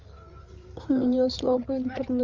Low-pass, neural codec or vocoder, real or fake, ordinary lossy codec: 7.2 kHz; codec, 16 kHz, 8 kbps, FreqCodec, larger model; fake; Opus, 32 kbps